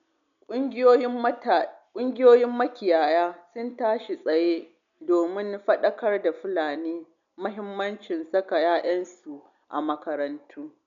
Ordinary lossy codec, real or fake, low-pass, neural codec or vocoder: none; real; 7.2 kHz; none